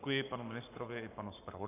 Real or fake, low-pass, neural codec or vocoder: fake; 3.6 kHz; codec, 44.1 kHz, 7.8 kbps, Pupu-Codec